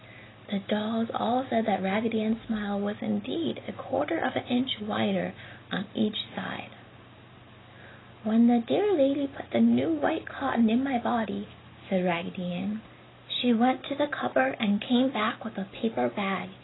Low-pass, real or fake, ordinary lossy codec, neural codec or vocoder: 7.2 kHz; real; AAC, 16 kbps; none